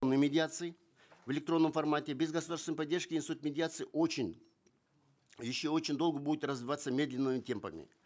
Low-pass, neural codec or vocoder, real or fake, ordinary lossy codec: none; none; real; none